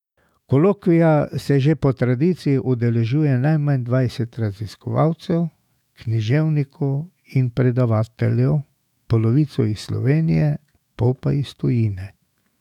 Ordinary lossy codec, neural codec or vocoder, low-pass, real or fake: none; autoencoder, 48 kHz, 128 numbers a frame, DAC-VAE, trained on Japanese speech; 19.8 kHz; fake